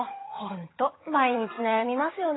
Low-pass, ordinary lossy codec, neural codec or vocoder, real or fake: 7.2 kHz; AAC, 16 kbps; vocoder, 22.05 kHz, 80 mel bands, HiFi-GAN; fake